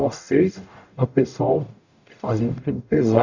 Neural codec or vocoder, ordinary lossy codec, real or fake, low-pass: codec, 44.1 kHz, 0.9 kbps, DAC; none; fake; 7.2 kHz